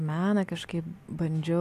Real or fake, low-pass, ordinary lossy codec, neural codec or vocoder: real; 14.4 kHz; AAC, 64 kbps; none